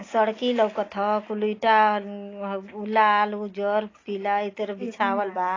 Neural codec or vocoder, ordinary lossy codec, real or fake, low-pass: none; AAC, 32 kbps; real; 7.2 kHz